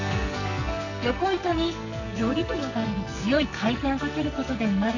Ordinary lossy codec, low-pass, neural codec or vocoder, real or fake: none; 7.2 kHz; codec, 44.1 kHz, 2.6 kbps, SNAC; fake